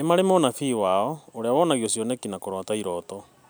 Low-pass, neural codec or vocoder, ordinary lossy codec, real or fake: none; none; none; real